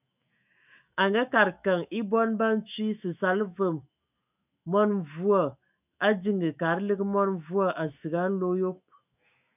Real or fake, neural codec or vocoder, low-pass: fake; autoencoder, 48 kHz, 128 numbers a frame, DAC-VAE, trained on Japanese speech; 3.6 kHz